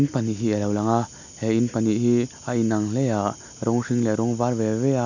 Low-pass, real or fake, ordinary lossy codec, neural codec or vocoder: 7.2 kHz; real; none; none